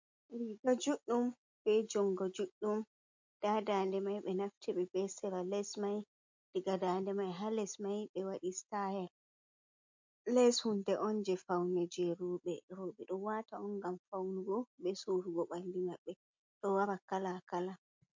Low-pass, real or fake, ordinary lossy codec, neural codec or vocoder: 7.2 kHz; real; MP3, 48 kbps; none